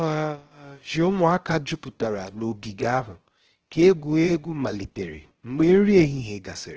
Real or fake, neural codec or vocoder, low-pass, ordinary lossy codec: fake; codec, 16 kHz, about 1 kbps, DyCAST, with the encoder's durations; 7.2 kHz; Opus, 16 kbps